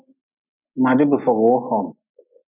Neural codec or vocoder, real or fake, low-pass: none; real; 3.6 kHz